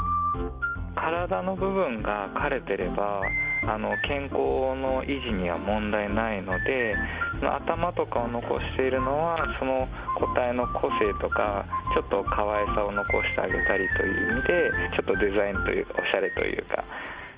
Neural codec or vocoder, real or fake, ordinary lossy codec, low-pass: none; real; Opus, 24 kbps; 3.6 kHz